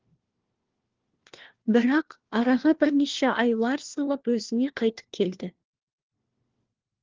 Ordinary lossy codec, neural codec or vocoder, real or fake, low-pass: Opus, 16 kbps; codec, 16 kHz, 1 kbps, FunCodec, trained on LibriTTS, 50 frames a second; fake; 7.2 kHz